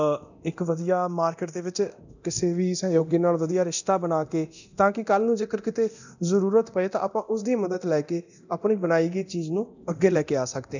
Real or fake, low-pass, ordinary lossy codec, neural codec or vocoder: fake; 7.2 kHz; none; codec, 24 kHz, 0.9 kbps, DualCodec